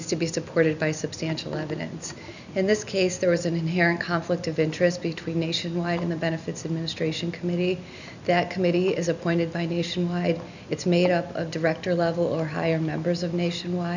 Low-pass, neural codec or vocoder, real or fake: 7.2 kHz; none; real